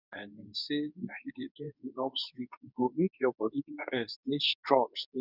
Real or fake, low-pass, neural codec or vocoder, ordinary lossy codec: fake; 5.4 kHz; codec, 24 kHz, 0.9 kbps, WavTokenizer, medium speech release version 2; none